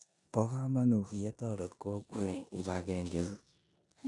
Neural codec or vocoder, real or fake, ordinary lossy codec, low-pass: codec, 16 kHz in and 24 kHz out, 0.9 kbps, LongCat-Audio-Codec, four codebook decoder; fake; none; 10.8 kHz